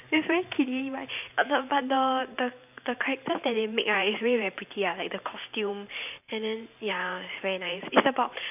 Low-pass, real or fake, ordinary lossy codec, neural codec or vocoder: 3.6 kHz; fake; none; vocoder, 44.1 kHz, 128 mel bands every 512 samples, BigVGAN v2